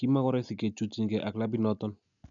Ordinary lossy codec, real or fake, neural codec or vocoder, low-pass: none; real; none; 7.2 kHz